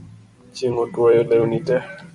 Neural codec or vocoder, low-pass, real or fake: vocoder, 44.1 kHz, 128 mel bands every 256 samples, BigVGAN v2; 10.8 kHz; fake